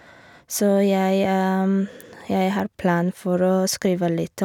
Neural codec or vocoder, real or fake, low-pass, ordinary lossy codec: none; real; 19.8 kHz; none